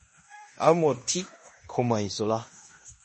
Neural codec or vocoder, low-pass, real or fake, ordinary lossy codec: codec, 16 kHz in and 24 kHz out, 0.9 kbps, LongCat-Audio-Codec, fine tuned four codebook decoder; 10.8 kHz; fake; MP3, 32 kbps